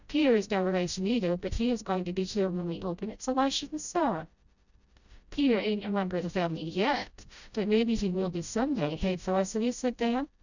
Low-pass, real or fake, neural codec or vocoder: 7.2 kHz; fake; codec, 16 kHz, 0.5 kbps, FreqCodec, smaller model